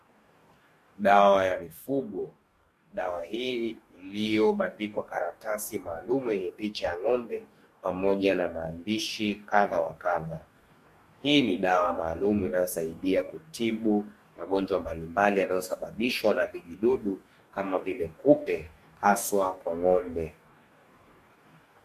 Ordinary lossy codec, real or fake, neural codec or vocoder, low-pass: MP3, 64 kbps; fake; codec, 44.1 kHz, 2.6 kbps, DAC; 14.4 kHz